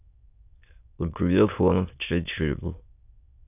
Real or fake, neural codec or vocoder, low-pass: fake; autoencoder, 22.05 kHz, a latent of 192 numbers a frame, VITS, trained on many speakers; 3.6 kHz